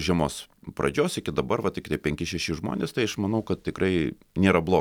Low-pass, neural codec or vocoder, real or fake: 19.8 kHz; none; real